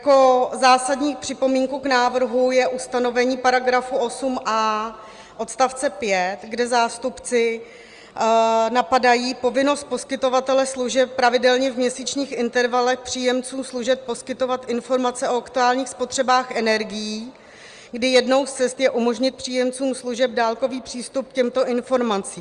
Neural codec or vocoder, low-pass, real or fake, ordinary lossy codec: none; 9.9 kHz; real; Opus, 32 kbps